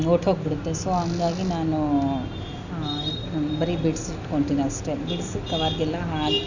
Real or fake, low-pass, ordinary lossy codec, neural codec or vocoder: real; 7.2 kHz; none; none